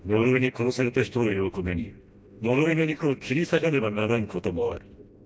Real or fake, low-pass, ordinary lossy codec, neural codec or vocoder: fake; none; none; codec, 16 kHz, 1 kbps, FreqCodec, smaller model